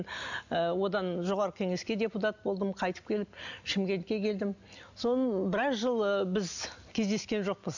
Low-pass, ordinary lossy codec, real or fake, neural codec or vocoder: 7.2 kHz; none; real; none